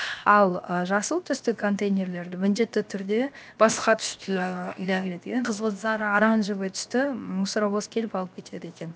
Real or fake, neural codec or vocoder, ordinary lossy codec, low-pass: fake; codec, 16 kHz, 0.7 kbps, FocalCodec; none; none